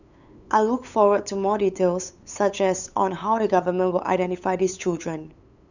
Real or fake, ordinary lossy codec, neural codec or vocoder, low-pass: fake; none; codec, 16 kHz, 8 kbps, FunCodec, trained on LibriTTS, 25 frames a second; 7.2 kHz